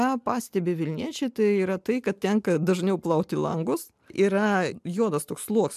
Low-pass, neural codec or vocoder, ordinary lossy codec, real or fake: 14.4 kHz; none; MP3, 96 kbps; real